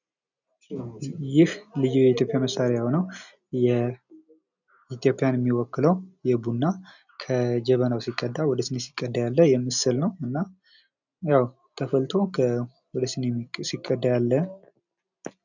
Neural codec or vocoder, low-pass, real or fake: none; 7.2 kHz; real